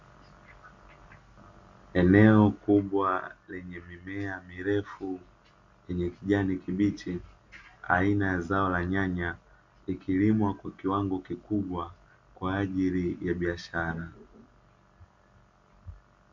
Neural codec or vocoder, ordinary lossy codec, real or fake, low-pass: none; MP3, 64 kbps; real; 7.2 kHz